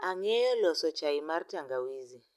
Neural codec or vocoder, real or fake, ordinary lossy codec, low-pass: none; real; none; 14.4 kHz